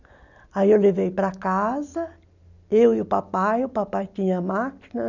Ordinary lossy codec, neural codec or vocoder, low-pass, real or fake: none; none; 7.2 kHz; real